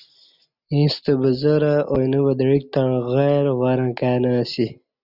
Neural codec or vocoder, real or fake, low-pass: none; real; 5.4 kHz